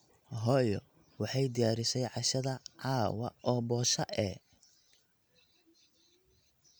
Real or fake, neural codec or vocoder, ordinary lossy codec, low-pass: real; none; none; none